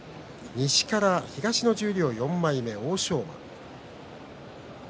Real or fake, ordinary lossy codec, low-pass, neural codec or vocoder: real; none; none; none